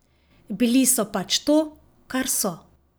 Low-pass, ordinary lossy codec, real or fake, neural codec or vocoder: none; none; real; none